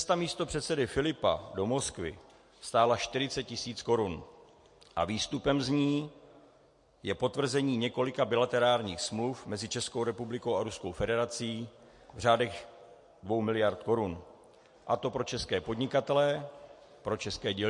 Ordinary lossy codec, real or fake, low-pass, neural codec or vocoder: MP3, 48 kbps; real; 10.8 kHz; none